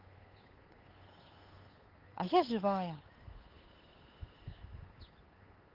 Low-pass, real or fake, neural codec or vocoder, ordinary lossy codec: 5.4 kHz; fake; codec, 16 kHz, 8 kbps, FunCodec, trained on Chinese and English, 25 frames a second; Opus, 32 kbps